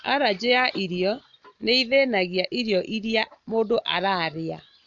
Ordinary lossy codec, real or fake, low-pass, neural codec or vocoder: MP3, 64 kbps; real; 7.2 kHz; none